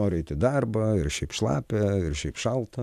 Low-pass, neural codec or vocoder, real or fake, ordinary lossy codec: 14.4 kHz; none; real; AAC, 96 kbps